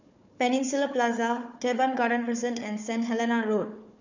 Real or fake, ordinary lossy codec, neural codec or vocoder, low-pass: fake; none; codec, 16 kHz, 4 kbps, FunCodec, trained on Chinese and English, 50 frames a second; 7.2 kHz